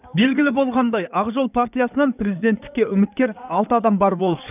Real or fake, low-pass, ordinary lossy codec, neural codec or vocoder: fake; 3.6 kHz; none; codec, 16 kHz in and 24 kHz out, 2.2 kbps, FireRedTTS-2 codec